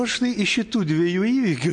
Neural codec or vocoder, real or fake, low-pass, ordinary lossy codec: none; real; 9.9 kHz; MP3, 48 kbps